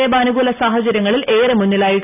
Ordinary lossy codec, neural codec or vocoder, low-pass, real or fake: none; none; 3.6 kHz; real